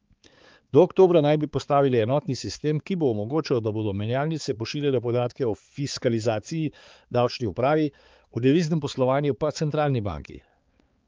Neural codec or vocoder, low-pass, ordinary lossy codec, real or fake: codec, 16 kHz, 4 kbps, X-Codec, HuBERT features, trained on balanced general audio; 7.2 kHz; Opus, 24 kbps; fake